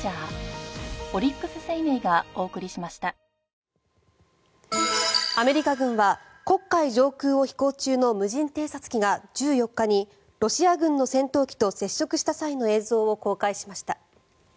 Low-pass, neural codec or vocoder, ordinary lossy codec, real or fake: none; none; none; real